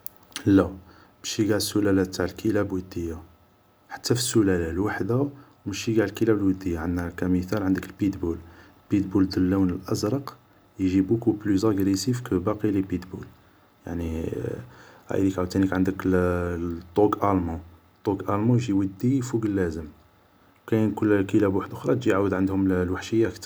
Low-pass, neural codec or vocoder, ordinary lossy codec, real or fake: none; none; none; real